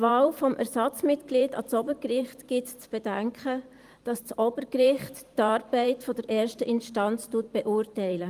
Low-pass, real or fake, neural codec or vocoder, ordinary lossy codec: 14.4 kHz; fake; vocoder, 44.1 kHz, 128 mel bands every 512 samples, BigVGAN v2; Opus, 32 kbps